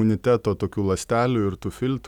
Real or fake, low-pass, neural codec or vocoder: real; 19.8 kHz; none